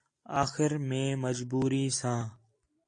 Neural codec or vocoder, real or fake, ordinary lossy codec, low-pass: none; real; AAC, 48 kbps; 9.9 kHz